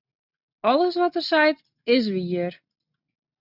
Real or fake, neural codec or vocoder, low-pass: real; none; 5.4 kHz